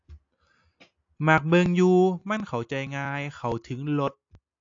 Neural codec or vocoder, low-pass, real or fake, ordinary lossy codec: none; 7.2 kHz; real; AAC, 48 kbps